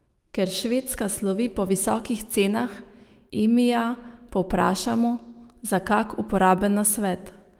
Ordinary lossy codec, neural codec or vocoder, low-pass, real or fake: Opus, 24 kbps; autoencoder, 48 kHz, 128 numbers a frame, DAC-VAE, trained on Japanese speech; 19.8 kHz; fake